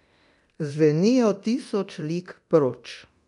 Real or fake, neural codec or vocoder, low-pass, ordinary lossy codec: fake; codec, 24 kHz, 0.9 kbps, DualCodec; 10.8 kHz; none